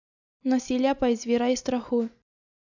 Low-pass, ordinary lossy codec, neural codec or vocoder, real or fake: 7.2 kHz; none; none; real